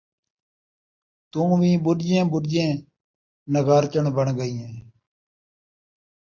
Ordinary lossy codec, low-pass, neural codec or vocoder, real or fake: MP3, 48 kbps; 7.2 kHz; none; real